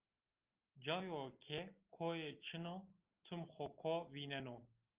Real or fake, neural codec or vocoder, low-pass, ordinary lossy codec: real; none; 3.6 kHz; Opus, 32 kbps